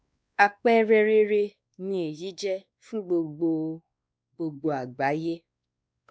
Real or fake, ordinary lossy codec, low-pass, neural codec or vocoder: fake; none; none; codec, 16 kHz, 2 kbps, X-Codec, WavLM features, trained on Multilingual LibriSpeech